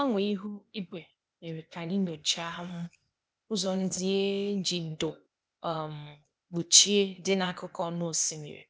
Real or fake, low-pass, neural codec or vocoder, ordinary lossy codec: fake; none; codec, 16 kHz, 0.8 kbps, ZipCodec; none